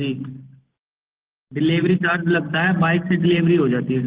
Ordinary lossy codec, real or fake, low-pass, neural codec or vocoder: Opus, 16 kbps; real; 3.6 kHz; none